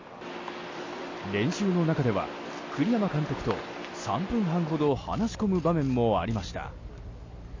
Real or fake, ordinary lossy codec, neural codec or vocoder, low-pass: real; MP3, 32 kbps; none; 7.2 kHz